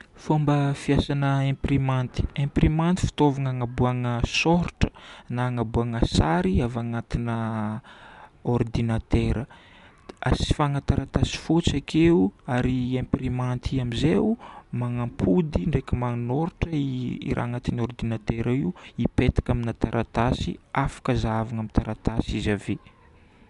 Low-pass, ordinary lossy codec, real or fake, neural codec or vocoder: 10.8 kHz; none; real; none